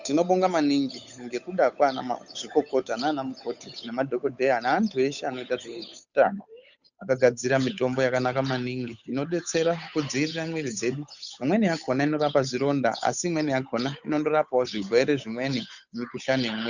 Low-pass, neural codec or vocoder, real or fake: 7.2 kHz; codec, 16 kHz, 8 kbps, FunCodec, trained on Chinese and English, 25 frames a second; fake